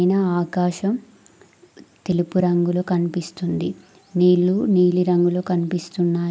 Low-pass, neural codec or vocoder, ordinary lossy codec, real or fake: none; none; none; real